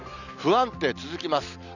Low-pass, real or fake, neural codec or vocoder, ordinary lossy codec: 7.2 kHz; real; none; none